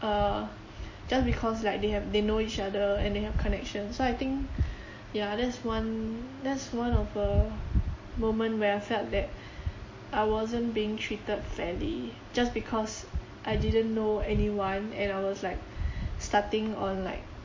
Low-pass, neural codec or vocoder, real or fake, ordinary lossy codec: 7.2 kHz; none; real; MP3, 32 kbps